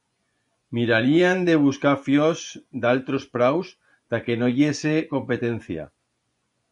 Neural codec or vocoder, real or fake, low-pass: vocoder, 24 kHz, 100 mel bands, Vocos; fake; 10.8 kHz